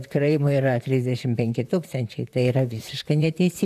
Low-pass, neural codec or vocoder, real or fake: 14.4 kHz; vocoder, 44.1 kHz, 128 mel bands, Pupu-Vocoder; fake